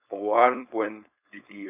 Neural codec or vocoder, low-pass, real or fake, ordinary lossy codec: codec, 16 kHz, 4.8 kbps, FACodec; 3.6 kHz; fake; none